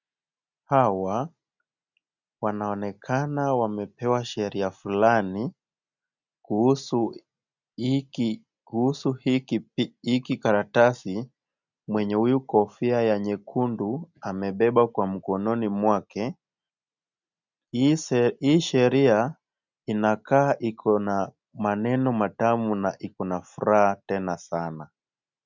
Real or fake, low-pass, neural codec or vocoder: real; 7.2 kHz; none